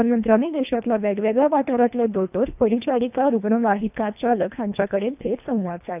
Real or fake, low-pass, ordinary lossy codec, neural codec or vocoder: fake; 3.6 kHz; none; codec, 24 kHz, 1.5 kbps, HILCodec